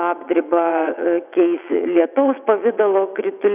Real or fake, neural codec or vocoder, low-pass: fake; vocoder, 22.05 kHz, 80 mel bands, WaveNeXt; 3.6 kHz